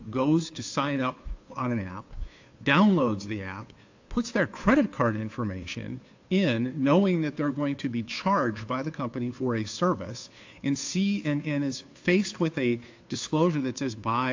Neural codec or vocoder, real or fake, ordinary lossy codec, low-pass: codec, 16 kHz, 2 kbps, FunCodec, trained on Chinese and English, 25 frames a second; fake; AAC, 48 kbps; 7.2 kHz